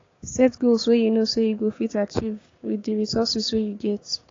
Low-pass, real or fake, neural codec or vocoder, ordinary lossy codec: 7.2 kHz; fake; codec, 16 kHz, 6 kbps, DAC; AAC, 32 kbps